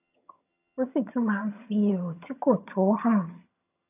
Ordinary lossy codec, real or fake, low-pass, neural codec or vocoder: AAC, 32 kbps; fake; 3.6 kHz; vocoder, 22.05 kHz, 80 mel bands, HiFi-GAN